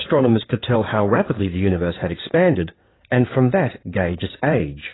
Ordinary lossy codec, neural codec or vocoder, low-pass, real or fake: AAC, 16 kbps; codec, 16 kHz in and 24 kHz out, 2.2 kbps, FireRedTTS-2 codec; 7.2 kHz; fake